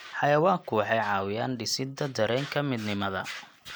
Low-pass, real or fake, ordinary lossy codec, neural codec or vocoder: none; real; none; none